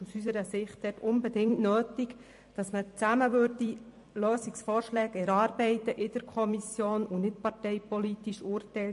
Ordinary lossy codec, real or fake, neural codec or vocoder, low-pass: MP3, 48 kbps; fake; vocoder, 44.1 kHz, 128 mel bands every 256 samples, BigVGAN v2; 14.4 kHz